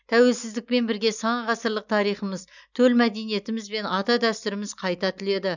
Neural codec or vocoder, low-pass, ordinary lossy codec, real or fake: none; 7.2 kHz; none; real